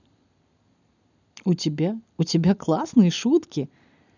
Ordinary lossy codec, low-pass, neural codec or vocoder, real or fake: none; 7.2 kHz; none; real